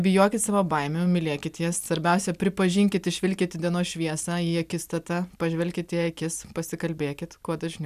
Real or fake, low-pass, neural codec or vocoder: real; 14.4 kHz; none